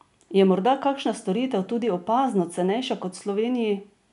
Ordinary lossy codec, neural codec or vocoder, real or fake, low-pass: none; none; real; 10.8 kHz